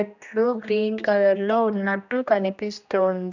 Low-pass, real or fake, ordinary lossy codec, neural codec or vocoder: 7.2 kHz; fake; none; codec, 16 kHz, 1 kbps, X-Codec, HuBERT features, trained on general audio